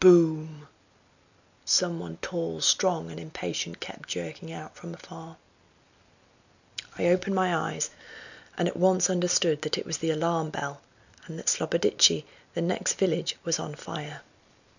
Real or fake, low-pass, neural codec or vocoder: real; 7.2 kHz; none